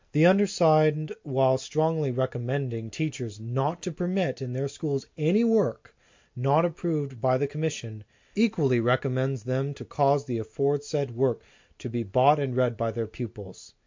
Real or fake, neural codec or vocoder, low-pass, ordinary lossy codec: real; none; 7.2 kHz; MP3, 48 kbps